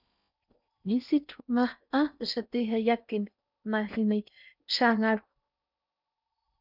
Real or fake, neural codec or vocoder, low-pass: fake; codec, 16 kHz in and 24 kHz out, 0.6 kbps, FocalCodec, streaming, 4096 codes; 5.4 kHz